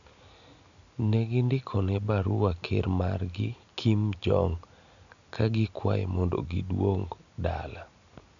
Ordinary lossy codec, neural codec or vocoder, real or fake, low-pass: none; none; real; 7.2 kHz